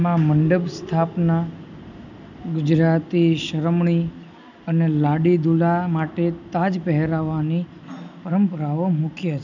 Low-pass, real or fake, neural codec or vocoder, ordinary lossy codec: 7.2 kHz; real; none; none